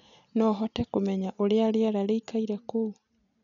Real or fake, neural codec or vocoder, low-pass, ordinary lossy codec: real; none; 7.2 kHz; none